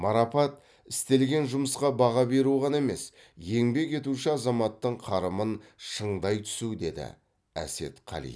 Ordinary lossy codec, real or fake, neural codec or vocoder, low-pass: none; real; none; none